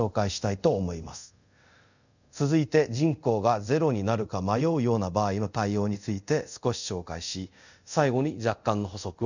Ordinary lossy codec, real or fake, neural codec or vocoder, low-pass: none; fake; codec, 24 kHz, 0.5 kbps, DualCodec; 7.2 kHz